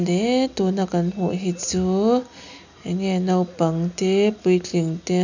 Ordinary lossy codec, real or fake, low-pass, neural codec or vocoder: none; real; 7.2 kHz; none